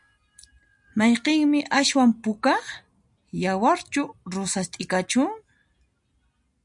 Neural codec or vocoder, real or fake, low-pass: none; real; 10.8 kHz